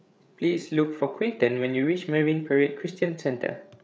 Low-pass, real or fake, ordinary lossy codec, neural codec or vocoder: none; fake; none; codec, 16 kHz, 8 kbps, FreqCodec, larger model